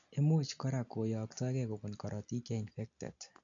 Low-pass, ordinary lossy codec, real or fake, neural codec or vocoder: 7.2 kHz; none; real; none